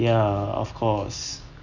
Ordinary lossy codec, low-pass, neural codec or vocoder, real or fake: none; 7.2 kHz; none; real